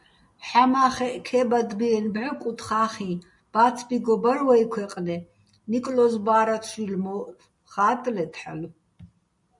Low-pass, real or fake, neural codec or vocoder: 10.8 kHz; real; none